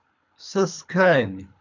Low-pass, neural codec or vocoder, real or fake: 7.2 kHz; codec, 24 kHz, 3 kbps, HILCodec; fake